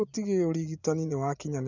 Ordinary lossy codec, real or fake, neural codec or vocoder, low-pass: none; fake; vocoder, 24 kHz, 100 mel bands, Vocos; 7.2 kHz